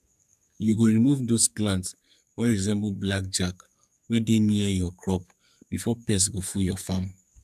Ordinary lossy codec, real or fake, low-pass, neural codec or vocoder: none; fake; 14.4 kHz; codec, 44.1 kHz, 2.6 kbps, SNAC